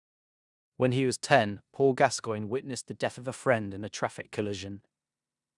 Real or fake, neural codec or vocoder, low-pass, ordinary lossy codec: fake; codec, 16 kHz in and 24 kHz out, 0.9 kbps, LongCat-Audio-Codec, four codebook decoder; 10.8 kHz; none